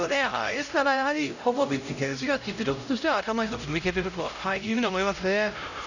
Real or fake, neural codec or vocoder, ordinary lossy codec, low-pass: fake; codec, 16 kHz, 0.5 kbps, X-Codec, HuBERT features, trained on LibriSpeech; none; 7.2 kHz